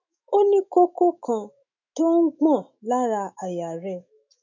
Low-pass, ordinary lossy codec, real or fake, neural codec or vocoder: 7.2 kHz; none; fake; autoencoder, 48 kHz, 128 numbers a frame, DAC-VAE, trained on Japanese speech